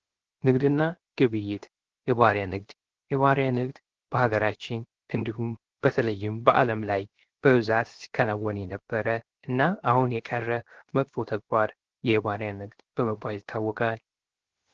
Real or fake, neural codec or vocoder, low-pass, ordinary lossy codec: fake; codec, 16 kHz, 0.7 kbps, FocalCodec; 7.2 kHz; Opus, 16 kbps